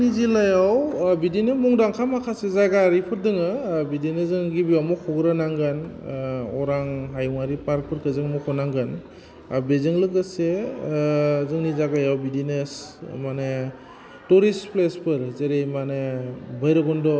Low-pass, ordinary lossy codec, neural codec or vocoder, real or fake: none; none; none; real